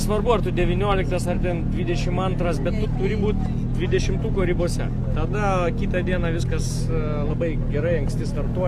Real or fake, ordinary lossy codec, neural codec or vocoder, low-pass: real; Opus, 64 kbps; none; 14.4 kHz